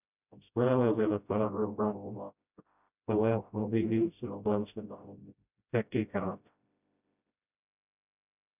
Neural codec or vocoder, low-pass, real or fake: codec, 16 kHz, 0.5 kbps, FreqCodec, smaller model; 3.6 kHz; fake